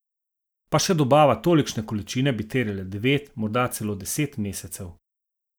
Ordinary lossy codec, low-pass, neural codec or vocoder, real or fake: none; none; none; real